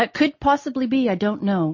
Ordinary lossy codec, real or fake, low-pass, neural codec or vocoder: MP3, 32 kbps; real; 7.2 kHz; none